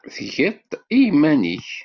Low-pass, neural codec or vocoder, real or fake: 7.2 kHz; none; real